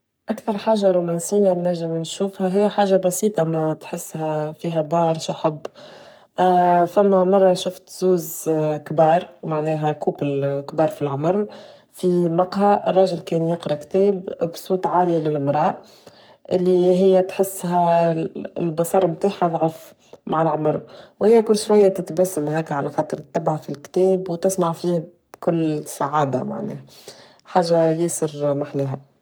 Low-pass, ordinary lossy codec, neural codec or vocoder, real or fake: none; none; codec, 44.1 kHz, 3.4 kbps, Pupu-Codec; fake